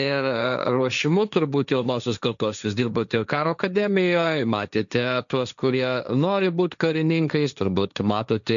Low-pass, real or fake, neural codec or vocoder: 7.2 kHz; fake; codec, 16 kHz, 1.1 kbps, Voila-Tokenizer